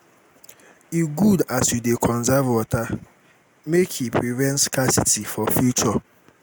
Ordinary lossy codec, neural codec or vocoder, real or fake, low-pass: none; none; real; none